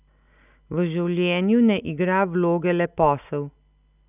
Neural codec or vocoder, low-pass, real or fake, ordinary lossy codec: none; 3.6 kHz; real; none